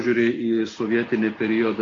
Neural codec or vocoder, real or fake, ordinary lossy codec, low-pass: none; real; AAC, 32 kbps; 7.2 kHz